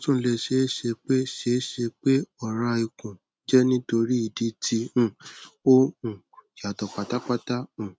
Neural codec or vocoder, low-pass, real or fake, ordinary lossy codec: none; none; real; none